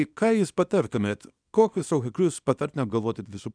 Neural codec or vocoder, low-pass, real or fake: codec, 24 kHz, 0.9 kbps, WavTokenizer, small release; 9.9 kHz; fake